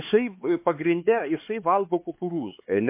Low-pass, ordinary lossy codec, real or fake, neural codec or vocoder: 3.6 kHz; MP3, 24 kbps; fake; codec, 16 kHz, 4 kbps, X-Codec, HuBERT features, trained on LibriSpeech